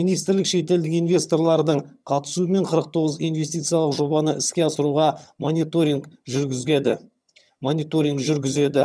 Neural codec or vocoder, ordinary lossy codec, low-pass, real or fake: vocoder, 22.05 kHz, 80 mel bands, HiFi-GAN; none; none; fake